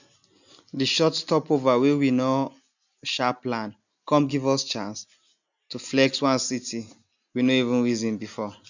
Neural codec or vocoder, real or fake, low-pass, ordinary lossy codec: none; real; 7.2 kHz; none